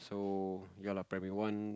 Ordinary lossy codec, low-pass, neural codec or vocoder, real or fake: none; none; none; real